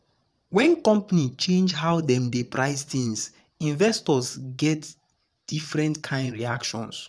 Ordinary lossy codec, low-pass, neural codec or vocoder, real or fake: none; none; vocoder, 22.05 kHz, 80 mel bands, Vocos; fake